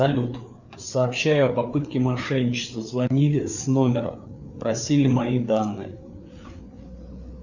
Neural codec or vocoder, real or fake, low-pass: codec, 16 kHz, 4 kbps, FreqCodec, larger model; fake; 7.2 kHz